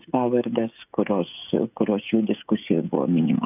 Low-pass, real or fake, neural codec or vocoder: 3.6 kHz; fake; codec, 16 kHz, 16 kbps, FreqCodec, smaller model